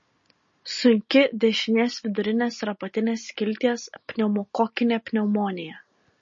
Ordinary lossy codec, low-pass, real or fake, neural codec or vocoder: MP3, 32 kbps; 7.2 kHz; real; none